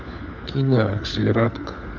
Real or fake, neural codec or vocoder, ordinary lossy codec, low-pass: fake; codec, 16 kHz, 4 kbps, FunCodec, trained on LibriTTS, 50 frames a second; none; 7.2 kHz